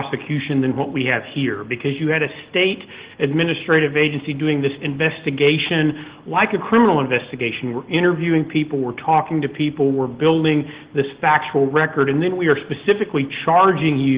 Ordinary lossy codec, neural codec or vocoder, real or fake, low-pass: Opus, 16 kbps; none; real; 3.6 kHz